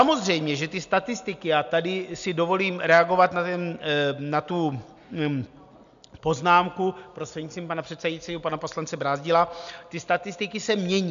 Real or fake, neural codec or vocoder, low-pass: real; none; 7.2 kHz